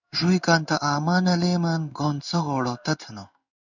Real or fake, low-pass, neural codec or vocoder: fake; 7.2 kHz; codec, 16 kHz in and 24 kHz out, 1 kbps, XY-Tokenizer